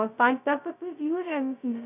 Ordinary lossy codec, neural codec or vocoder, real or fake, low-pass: none; codec, 16 kHz, 0.2 kbps, FocalCodec; fake; 3.6 kHz